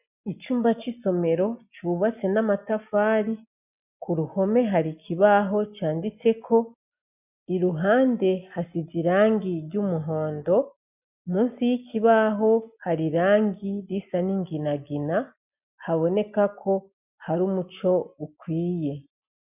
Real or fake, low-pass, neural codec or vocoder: real; 3.6 kHz; none